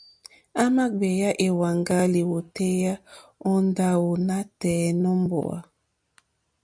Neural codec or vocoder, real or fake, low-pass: none; real; 9.9 kHz